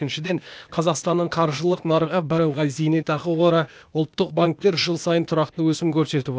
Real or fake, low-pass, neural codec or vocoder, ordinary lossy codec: fake; none; codec, 16 kHz, 0.8 kbps, ZipCodec; none